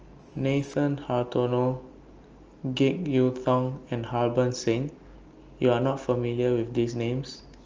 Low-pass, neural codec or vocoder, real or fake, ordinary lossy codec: 7.2 kHz; none; real; Opus, 24 kbps